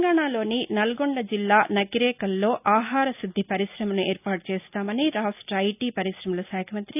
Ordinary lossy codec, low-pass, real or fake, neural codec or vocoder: none; 3.6 kHz; real; none